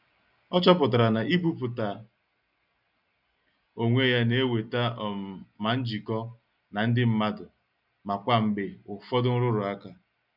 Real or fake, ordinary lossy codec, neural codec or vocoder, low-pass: real; none; none; 5.4 kHz